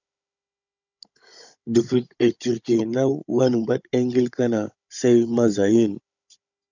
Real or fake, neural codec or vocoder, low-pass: fake; codec, 16 kHz, 16 kbps, FunCodec, trained on Chinese and English, 50 frames a second; 7.2 kHz